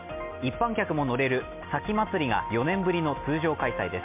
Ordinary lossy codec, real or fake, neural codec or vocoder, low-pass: MP3, 32 kbps; real; none; 3.6 kHz